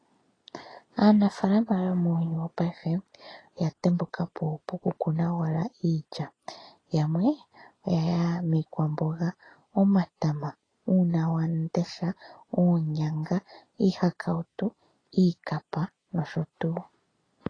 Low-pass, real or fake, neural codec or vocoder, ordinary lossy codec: 9.9 kHz; real; none; AAC, 32 kbps